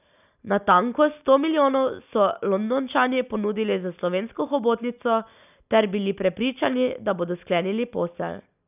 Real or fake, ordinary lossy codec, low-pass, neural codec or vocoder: real; none; 3.6 kHz; none